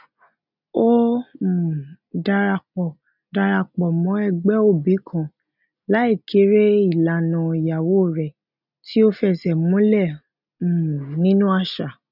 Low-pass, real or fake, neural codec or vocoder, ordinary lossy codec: 5.4 kHz; real; none; none